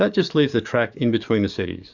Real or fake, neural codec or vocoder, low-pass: fake; codec, 44.1 kHz, 7.8 kbps, DAC; 7.2 kHz